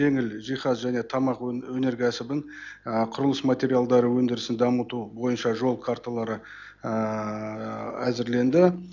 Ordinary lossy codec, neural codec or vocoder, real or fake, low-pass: none; none; real; 7.2 kHz